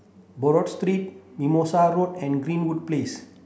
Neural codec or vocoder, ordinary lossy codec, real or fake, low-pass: none; none; real; none